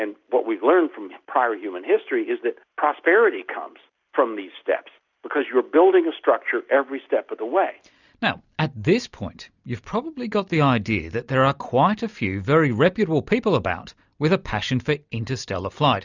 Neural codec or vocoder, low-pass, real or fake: none; 7.2 kHz; real